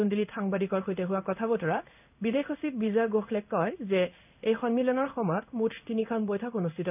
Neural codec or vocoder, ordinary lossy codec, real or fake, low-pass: codec, 16 kHz in and 24 kHz out, 1 kbps, XY-Tokenizer; none; fake; 3.6 kHz